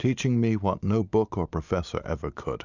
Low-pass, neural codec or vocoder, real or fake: 7.2 kHz; none; real